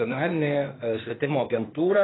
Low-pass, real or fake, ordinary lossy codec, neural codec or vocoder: 7.2 kHz; fake; AAC, 16 kbps; codec, 16 kHz, 0.8 kbps, ZipCodec